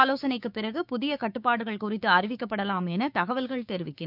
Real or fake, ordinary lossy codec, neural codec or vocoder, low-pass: fake; none; codec, 16 kHz, 6 kbps, DAC; 5.4 kHz